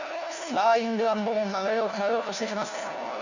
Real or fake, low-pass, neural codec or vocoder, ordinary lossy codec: fake; 7.2 kHz; codec, 16 kHz, 1 kbps, FunCodec, trained on LibriTTS, 50 frames a second; none